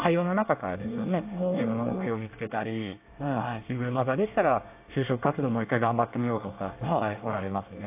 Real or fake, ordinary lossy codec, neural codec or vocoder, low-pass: fake; AAC, 32 kbps; codec, 24 kHz, 1 kbps, SNAC; 3.6 kHz